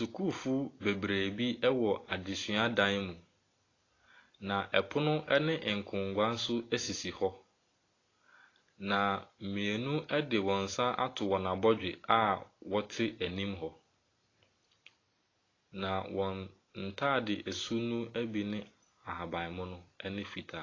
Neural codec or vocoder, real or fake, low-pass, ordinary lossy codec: none; real; 7.2 kHz; AAC, 32 kbps